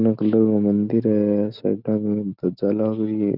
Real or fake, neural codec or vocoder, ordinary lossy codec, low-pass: real; none; none; 5.4 kHz